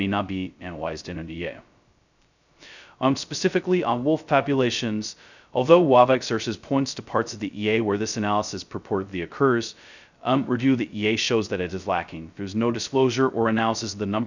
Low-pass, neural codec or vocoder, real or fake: 7.2 kHz; codec, 16 kHz, 0.2 kbps, FocalCodec; fake